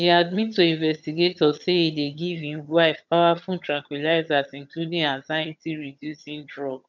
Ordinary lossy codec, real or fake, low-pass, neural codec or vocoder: none; fake; 7.2 kHz; vocoder, 22.05 kHz, 80 mel bands, HiFi-GAN